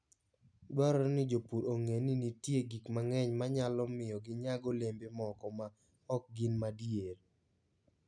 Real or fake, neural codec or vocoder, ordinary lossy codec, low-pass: real; none; none; 9.9 kHz